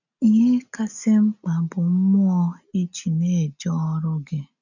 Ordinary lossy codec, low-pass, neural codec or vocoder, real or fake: none; 7.2 kHz; none; real